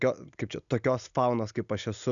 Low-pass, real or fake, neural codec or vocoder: 7.2 kHz; real; none